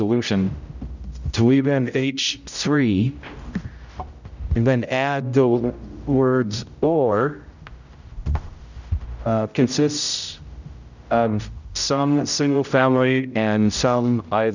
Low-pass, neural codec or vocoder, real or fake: 7.2 kHz; codec, 16 kHz, 0.5 kbps, X-Codec, HuBERT features, trained on general audio; fake